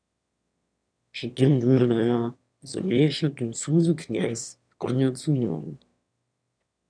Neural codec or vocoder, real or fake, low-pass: autoencoder, 22.05 kHz, a latent of 192 numbers a frame, VITS, trained on one speaker; fake; 9.9 kHz